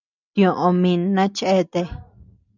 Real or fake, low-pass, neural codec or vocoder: real; 7.2 kHz; none